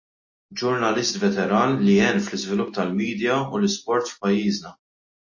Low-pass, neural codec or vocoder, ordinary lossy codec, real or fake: 7.2 kHz; none; MP3, 32 kbps; real